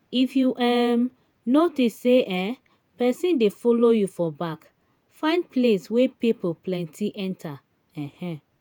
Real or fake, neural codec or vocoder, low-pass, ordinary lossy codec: fake; vocoder, 48 kHz, 128 mel bands, Vocos; none; none